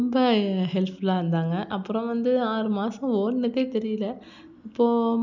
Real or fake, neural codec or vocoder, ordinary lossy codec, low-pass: real; none; none; 7.2 kHz